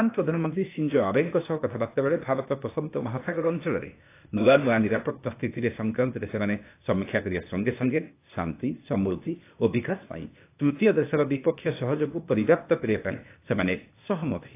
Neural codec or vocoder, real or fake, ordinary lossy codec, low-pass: codec, 16 kHz, 0.8 kbps, ZipCodec; fake; AAC, 24 kbps; 3.6 kHz